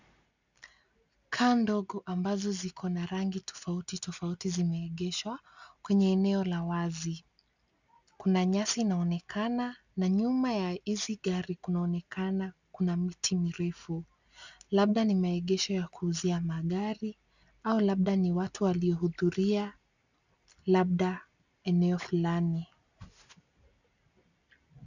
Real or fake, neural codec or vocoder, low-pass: real; none; 7.2 kHz